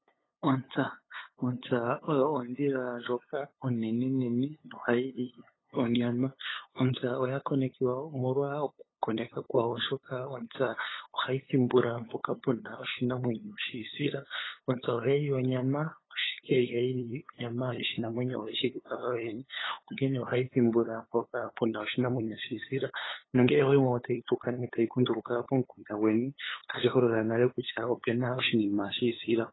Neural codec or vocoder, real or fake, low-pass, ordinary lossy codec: codec, 16 kHz, 8 kbps, FunCodec, trained on LibriTTS, 25 frames a second; fake; 7.2 kHz; AAC, 16 kbps